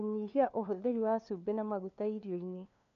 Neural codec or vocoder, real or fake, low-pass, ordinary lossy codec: codec, 16 kHz, 2 kbps, FunCodec, trained on LibriTTS, 25 frames a second; fake; 7.2 kHz; none